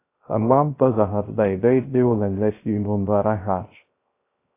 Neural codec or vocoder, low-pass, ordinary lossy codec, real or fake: codec, 16 kHz, 0.3 kbps, FocalCodec; 3.6 kHz; AAC, 24 kbps; fake